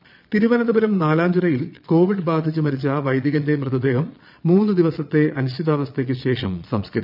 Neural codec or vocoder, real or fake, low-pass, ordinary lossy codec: vocoder, 22.05 kHz, 80 mel bands, Vocos; fake; 5.4 kHz; none